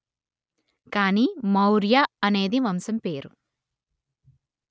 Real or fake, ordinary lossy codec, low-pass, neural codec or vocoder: real; none; none; none